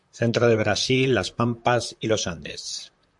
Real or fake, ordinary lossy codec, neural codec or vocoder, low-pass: fake; MP3, 64 kbps; vocoder, 44.1 kHz, 128 mel bands, Pupu-Vocoder; 10.8 kHz